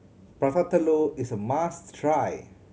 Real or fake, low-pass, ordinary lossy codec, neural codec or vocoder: real; none; none; none